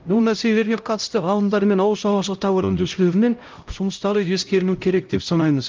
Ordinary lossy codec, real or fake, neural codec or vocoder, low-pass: Opus, 24 kbps; fake; codec, 16 kHz, 0.5 kbps, X-Codec, HuBERT features, trained on LibriSpeech; 7.2 kHz